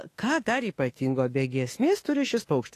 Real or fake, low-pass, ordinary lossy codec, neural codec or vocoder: fake; 14.4 kHz; AAC, 48 kbps; autoencoder, 48 kHz, 32 numbers a frame, DAC-VAE, trained on Japanese speech